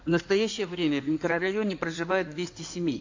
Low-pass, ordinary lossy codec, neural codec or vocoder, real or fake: 7.2 kHz; AAC, 48 kbps; codec, 16 kHz in and 24 kHz out, 2.2 kbps, FireRedTTS-2 codec; fake